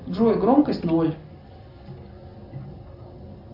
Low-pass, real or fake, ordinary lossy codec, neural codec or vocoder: 5.4 kHz; real; AAC, 48 kbps; none